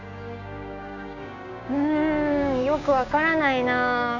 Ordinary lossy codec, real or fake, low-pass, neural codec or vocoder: none; fake; 7.2 kHz; codec, 16 kHz, 6 kbps, DAC